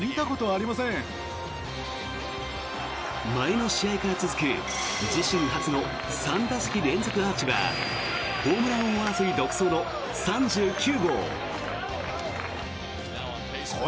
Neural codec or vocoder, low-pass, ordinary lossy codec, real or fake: none; none; none; real